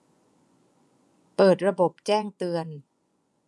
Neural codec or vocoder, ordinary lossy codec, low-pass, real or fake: vocoder, 24 kHz, 100 mel bands, Vocos; none; none; fake